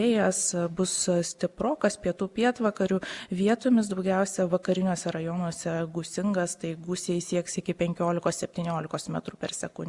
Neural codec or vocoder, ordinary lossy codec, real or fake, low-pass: none; Opus, 64 kbps; real; 10.8 kHz